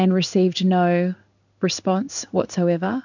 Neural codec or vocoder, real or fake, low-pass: codec, 16 kHz in and 24 kHz out, 1 kbps, XY-Tokenizer; fake; 7.2 kHz